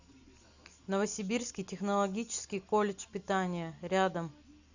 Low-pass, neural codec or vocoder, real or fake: 7.2 kHz; none; real